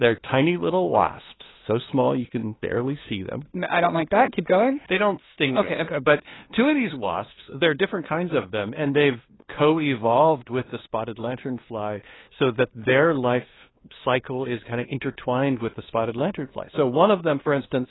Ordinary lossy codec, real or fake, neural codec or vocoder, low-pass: AAC, 16 kbps; fake; codec, 16 kHz, 2 kbps, FunCodec, trained on LibriTTS, 25 frames a second; 7.2 kHz